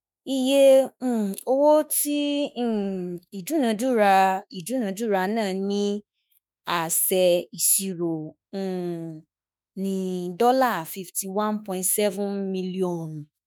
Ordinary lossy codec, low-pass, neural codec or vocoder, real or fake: none; none; autoencoder, 48 kHz, 32 numbers a frame, DAC-VAE, trained on Japanese speech; fake